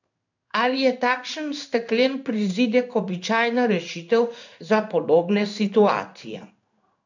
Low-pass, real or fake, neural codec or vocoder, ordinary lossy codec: 7.2 kHz; fake; codec, 16 kHz in and 24 kHz out, 1 kbps, XY-Tokenizer; none